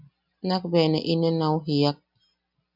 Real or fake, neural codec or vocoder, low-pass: real; none; 5.4 kHz